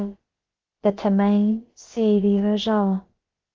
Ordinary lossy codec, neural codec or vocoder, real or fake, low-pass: Opus, 16 kbps; codec, 16 kHz, about 1 kbps, DyCAST, with the encoder's durations; fake; 7.2 kHz